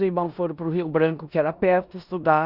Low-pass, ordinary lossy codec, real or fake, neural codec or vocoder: 5.4 kHz; Opus, 64 kbps; fake; codec, 16 kHz in and 24 kHz out, 0.9 kbps, LongCat-Audio-Codec, four codebook decoder